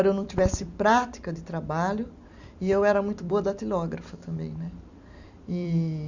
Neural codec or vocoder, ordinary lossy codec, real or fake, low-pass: vocoder, 44.1 kHz, 128 mel bands every 256 samples, BigVGAN v2; none; fake; 7.2 kHz